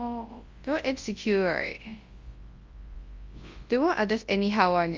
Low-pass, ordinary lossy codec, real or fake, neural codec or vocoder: 7.2 kHz; Opus, 32 kbps; fake; codec, 24 kHz, 0.9 kbps, WavTokenizer, large speech release